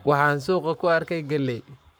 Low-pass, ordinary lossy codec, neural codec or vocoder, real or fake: none; none; codec, 44.1 kHz, 7.8 kbps, Pupu-Codec; fake